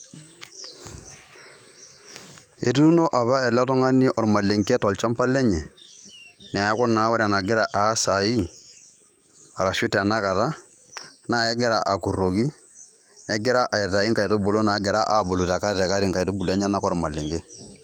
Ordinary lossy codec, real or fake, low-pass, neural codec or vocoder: none; fake; 19.8 kHz; codec, 44.1 kHz, 7.8 kbps, DAC